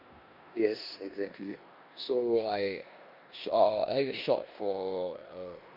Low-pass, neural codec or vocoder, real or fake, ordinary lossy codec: 5.4 kHz; codec, 16 kHz in and 24 kHz out, 0.9 kbps, LongCat-Audio-Codec, four codebook decoder; fake; MP3, 48 kbps